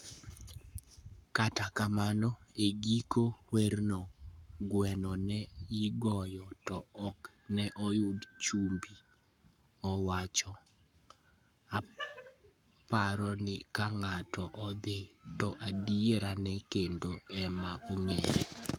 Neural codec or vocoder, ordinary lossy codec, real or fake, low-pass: codec, 44.1 kHz, 7.8 kbps, Pupu-Codec; none; fake; 19.8 kHz